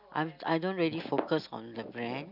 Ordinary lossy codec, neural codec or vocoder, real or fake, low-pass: none; none; real; 5.4 kHz